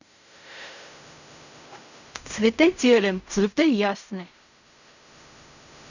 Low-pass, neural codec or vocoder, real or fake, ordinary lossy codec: 7.2 kHz; codec, 16 kHz in and 24 kHz out, 0.4 kbps, LongCat-Audio-Codec, fine tuned four codebook decoder; fake; none